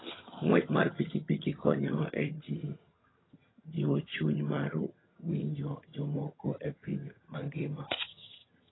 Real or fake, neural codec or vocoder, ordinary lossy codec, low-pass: fake; vocoder, 22.05 kHz, 80 mel bands, HiFi-GAN; AAC, 16 kbps; 7.2 kHz